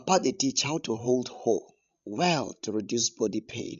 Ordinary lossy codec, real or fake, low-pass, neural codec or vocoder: none; fake; 7.2 kHz; codec, 16 kHz, 16 kbps, FreqCodec, larger model